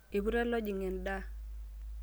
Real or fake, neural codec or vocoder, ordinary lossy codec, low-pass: real; none; none; none